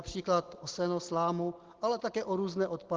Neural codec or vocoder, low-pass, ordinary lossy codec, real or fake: none; 7.2 kHz; Opus, 16 kbps; real